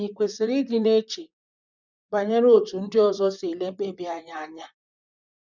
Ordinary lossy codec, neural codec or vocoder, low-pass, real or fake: none; vocoder, 44.1 kHz, 128 mel bands, Pupu-Vocoder; 7.2 kHz; fake